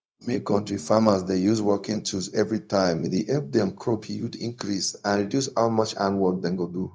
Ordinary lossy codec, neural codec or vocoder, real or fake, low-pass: none; codec, 16 kHz, 0.4 kbps, LongCat-Audio-Codec; fake; none